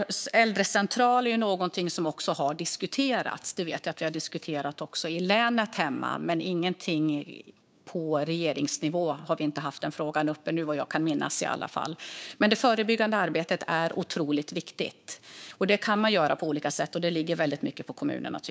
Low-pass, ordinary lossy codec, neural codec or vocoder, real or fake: none; none; codec, 16 kHz, 6 kbps, DAC; fake